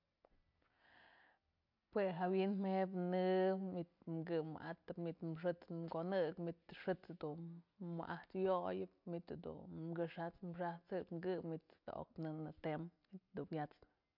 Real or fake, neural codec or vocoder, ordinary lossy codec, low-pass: real; none; MP3, 48 kbps; 5.4 kHz